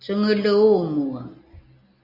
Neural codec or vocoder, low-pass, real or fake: none; 5.4 kHz; real